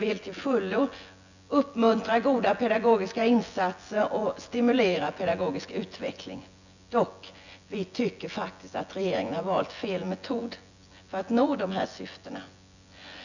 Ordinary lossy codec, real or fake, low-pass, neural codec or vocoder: none; fake; 7.2 kHz; vocoder, 24 kHz, 100 mel bands, Vocos